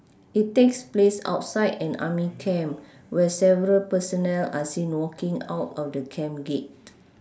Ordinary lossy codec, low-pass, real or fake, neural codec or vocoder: none; none; real; none